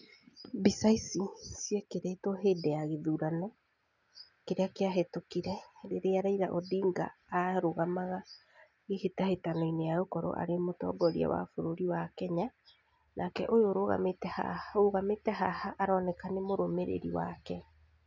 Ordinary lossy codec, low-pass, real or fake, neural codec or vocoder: none; 7.2 kHz; real; none